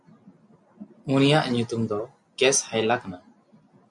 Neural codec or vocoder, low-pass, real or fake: none; 10.8 kHz; real